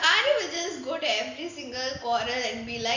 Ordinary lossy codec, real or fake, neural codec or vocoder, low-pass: none; real; none; 7.2 kHz